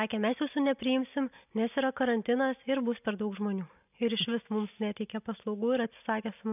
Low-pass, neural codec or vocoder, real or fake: 3.6 kHz; none; real